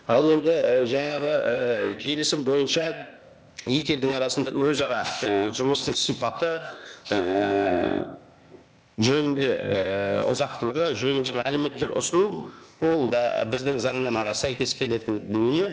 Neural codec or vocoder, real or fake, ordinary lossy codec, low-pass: codec, 16 kHz, 0.8 kbps, ZipCodec; fake; none; none